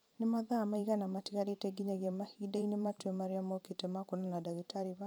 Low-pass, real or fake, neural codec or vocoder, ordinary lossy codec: none; fake; vocoder, 44.1 kHz, 128 mel bands every 512 samples, BigVGAN v2; none